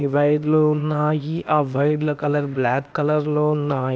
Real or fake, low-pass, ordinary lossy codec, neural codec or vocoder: fake; none; none; codec, 16 kHz, 0.8 kbps, ZipCodec